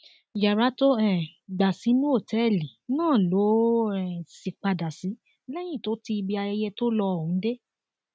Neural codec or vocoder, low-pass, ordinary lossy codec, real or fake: none; none; none; real